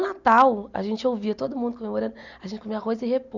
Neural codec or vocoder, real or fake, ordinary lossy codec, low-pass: none; real; none; 7.2 kHz